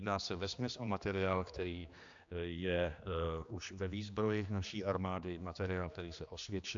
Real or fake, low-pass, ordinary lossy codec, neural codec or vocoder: fake; 7.2 kHz; AAC, 64 kbps; codec, 16 kHz, 2 kbps, X-Codec, HuBERT features, trained on general audio